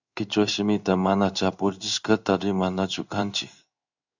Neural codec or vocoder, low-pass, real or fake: codec, 16 kHz in and 24 kHz out, 1 kbps, XY-Tokenizer; 7.2 kHz; fake